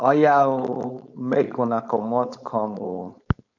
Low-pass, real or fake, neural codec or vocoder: 7.2 kHz; fake; codec, 16 kHz, 4.8 kbps, FACodec